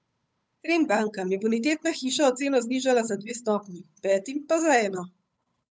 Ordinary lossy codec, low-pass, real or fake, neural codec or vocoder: none; none; fake; codec, 16 kHz, 8 kbps, FunCodec, trained on Chinese and English, 25 frames a second